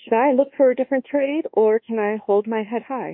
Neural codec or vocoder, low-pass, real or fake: codec, 24 kHz, 1.2 kbps, DualCodec; 5.4 kHz; fake